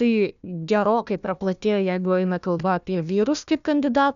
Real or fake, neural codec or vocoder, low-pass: fake; codec, 16 kHz, 1 kbps, FunCodec, trained on Chinese and English, 50 frames a second; 7.2 kHz